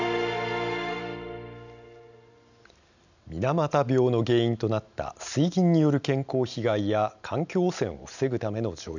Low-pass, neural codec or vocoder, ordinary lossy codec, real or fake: 7.2 kHz; none; none; real